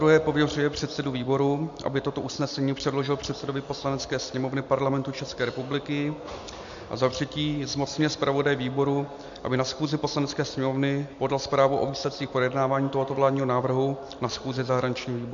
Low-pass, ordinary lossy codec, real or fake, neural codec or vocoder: 7.2 kHz; AAC, 64 kbps; real; none